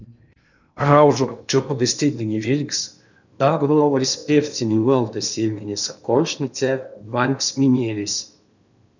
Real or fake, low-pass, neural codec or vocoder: fake; 7.2 kHz; codec, 16 kHz in and 24 kHz out, 0.8 kbps, FocalCodec, streaming, 65536 codes